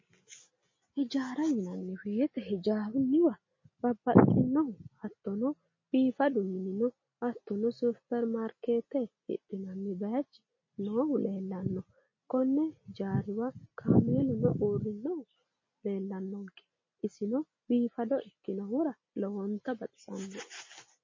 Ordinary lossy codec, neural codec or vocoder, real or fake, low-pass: MP3, 32 kbps; none; real; 7.2 kHz